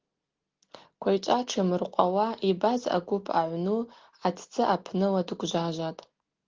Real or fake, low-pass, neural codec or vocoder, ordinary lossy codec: real; 7.2 kHz; none; Opus, 16 kbps